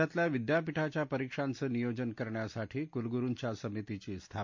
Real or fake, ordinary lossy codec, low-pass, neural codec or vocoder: real; MP3, 48 kbps; 7.2 kHz; none